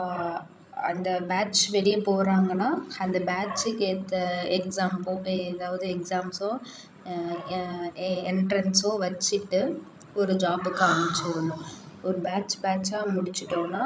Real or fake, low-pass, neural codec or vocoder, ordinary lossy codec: fake; none; codec, 16 kHz, 16 kbps, FreqCodec, larger model; none